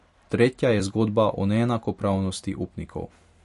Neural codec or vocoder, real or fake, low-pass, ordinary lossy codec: vocoder, 44.1 kHz, 128 mel bands every 256 samples, BigVGAN v2; fake; 14.4 kHz; MP3, 48 kbps